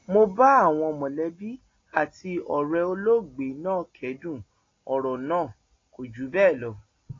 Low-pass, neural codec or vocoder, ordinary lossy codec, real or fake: 7.2 kHz; none; AAC, 32 kbps; real